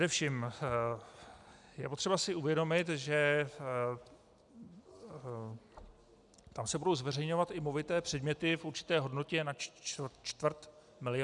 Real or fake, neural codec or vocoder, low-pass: real; none; 10.8 kHz